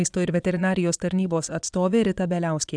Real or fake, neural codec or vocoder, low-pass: fake; vocoder, 22.05 kHz, 80 mel bands, Vocos; 9.9 kHz